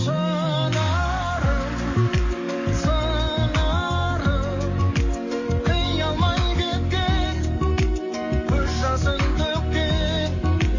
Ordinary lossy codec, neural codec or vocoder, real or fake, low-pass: MP3, 32 kbps; codec, 44.1 kHz, 7.8 kbps, DAC; fake; 7.2 kHz